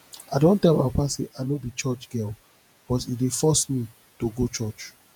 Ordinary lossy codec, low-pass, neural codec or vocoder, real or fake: none; none; vocoder, 48 kHz, 128 mel bands, Vocos; fake